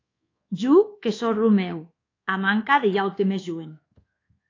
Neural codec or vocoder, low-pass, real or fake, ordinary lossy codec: codec, 24 kHz, 1.2 kbps, DualCodec; 7.2 kHz; fake; AAC, 32 kbps